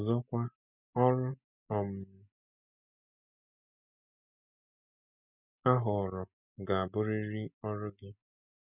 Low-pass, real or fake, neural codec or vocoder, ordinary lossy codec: 3.6 kHz; real; none; none